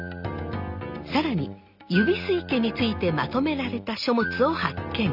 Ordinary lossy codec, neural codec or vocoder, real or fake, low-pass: none; none; real; 5.4 kHz